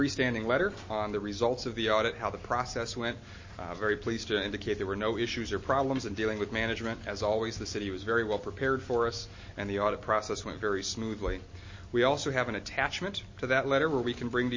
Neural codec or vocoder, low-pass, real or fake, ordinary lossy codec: none; 7.2 kHz; real; MP3, 32 kbps